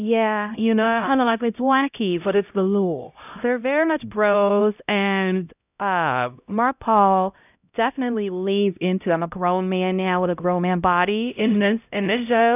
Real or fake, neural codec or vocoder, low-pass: fake; codec, 16 kHz, 0.5 kbps, X-Codec, HuBERT features, trained on LibriSpeech; 3.6 kHz